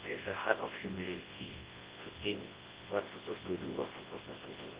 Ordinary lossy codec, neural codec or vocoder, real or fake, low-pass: Opus, 16 kbps; codec, 24 kHz, 0.9 kbps, WavTokenizer, large speech release; fake; 3.6 kHz